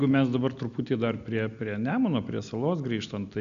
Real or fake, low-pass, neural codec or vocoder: real; 7.2 kHz; none